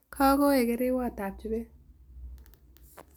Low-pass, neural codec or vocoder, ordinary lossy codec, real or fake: none; none; none; real